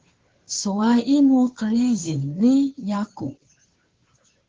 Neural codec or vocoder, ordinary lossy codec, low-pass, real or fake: codec, 16 kHz, 2 kbps, FunCodec, trained on Chinese and English, 25 frames a second; Opus, 16 kbps; 7.2 kHz; fake